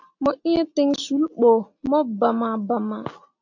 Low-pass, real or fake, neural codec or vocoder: 7.2 kHz; real; none